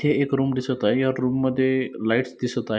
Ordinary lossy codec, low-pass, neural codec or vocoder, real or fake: none; none; none; real